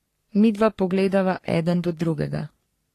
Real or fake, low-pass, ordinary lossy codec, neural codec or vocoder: fake; 14.4 kHz; AAC, 48 kbps; codec, 44.1 kHz, 3.4 kbps, Pupu-Codec